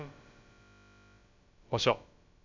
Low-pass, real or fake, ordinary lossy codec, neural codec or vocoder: 7.2 kHz; fake; MP3, 64 kbps; codec, 16 kHz, about 1 kbps, DyCAST, with the encoder's durations